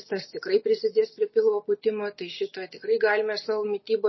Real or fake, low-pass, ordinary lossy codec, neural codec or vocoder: real; 7.2 kHz; MP3, 24 kbps; none